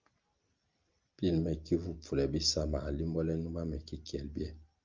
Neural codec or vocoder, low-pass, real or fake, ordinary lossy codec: none; 7.2 kHz; real; Opus, 24 kbps